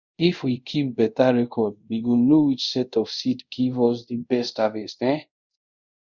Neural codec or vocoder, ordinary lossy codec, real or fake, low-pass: codec, 24 kHz, 0.5 kbps, DualCodec; Opus, 64 kbps; fake; 7.2 kHz